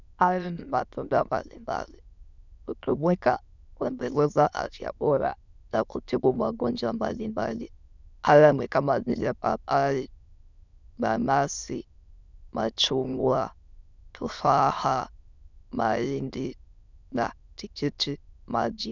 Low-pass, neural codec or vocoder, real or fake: 7.2 kHz; autoencoder, 22.05 kHz, a latent of 192 numbers a frame, VITS, trained on many speakers; fake